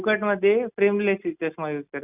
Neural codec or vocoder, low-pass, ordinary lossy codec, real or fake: none; 3.6 kHz; none; real